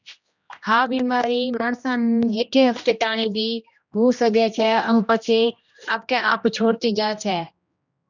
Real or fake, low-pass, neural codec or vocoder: fake; 7.2 kHz; codec, 16 kHz, 1 kbps, X-Codec, HuBERT features, trained on general audio